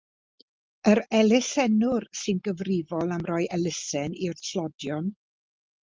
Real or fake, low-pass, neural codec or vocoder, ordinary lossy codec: real; 7.2 kHz; none; Opus, 32 kbps